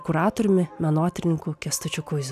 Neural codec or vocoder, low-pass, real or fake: none; 14.4 kHz; real